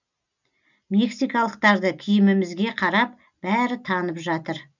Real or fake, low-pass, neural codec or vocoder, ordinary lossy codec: real; 7.2 kHz; none; none